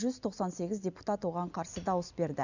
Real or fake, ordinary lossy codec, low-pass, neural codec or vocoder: real; none; 7.2 kHz; none